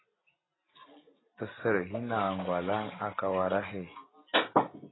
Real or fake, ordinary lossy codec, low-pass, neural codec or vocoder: real; AAC, 16 kbps; 7.2 kHz; none